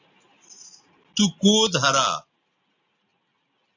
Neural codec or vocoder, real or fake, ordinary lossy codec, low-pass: none; real; AAC, 48 kbps; 7.2 kHz